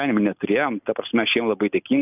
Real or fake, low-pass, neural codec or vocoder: real; 3.6 kHz; none